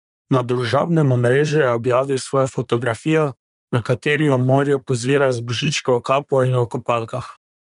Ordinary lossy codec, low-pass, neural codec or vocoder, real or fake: none; 10.8 kHz; codec, 24 kHz, 1 kbps, SNAC; fake